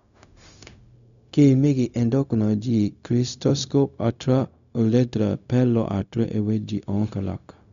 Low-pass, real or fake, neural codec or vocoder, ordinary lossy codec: 7.2 kHz; fake; codec, 16 kHz, 0.4 kbps, LongCat-Audio-Codec; none